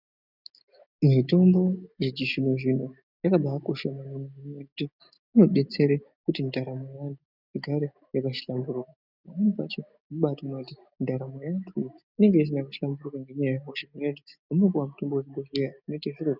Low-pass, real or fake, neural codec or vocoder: 5.4 kHz; real; none